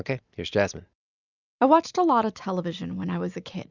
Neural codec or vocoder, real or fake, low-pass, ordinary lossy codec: none; real; 7.2 kHz; Opus, 64 kbps